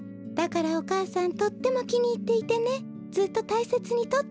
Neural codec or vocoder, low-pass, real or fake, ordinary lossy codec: none; none; real; none